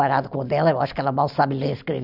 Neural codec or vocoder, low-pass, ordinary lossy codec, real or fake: none; 5.4 kHz; none; real